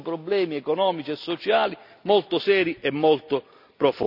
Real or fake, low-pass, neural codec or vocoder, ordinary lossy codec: real; 5.4 kHz; none; none